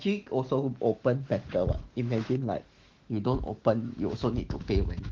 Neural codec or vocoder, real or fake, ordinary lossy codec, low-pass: autoencoder, 48 kHz, 128 numbers a frame, DAC-VAE, trained on Japanese speech; fake; Opus, 16 kbps; 7.2 kHz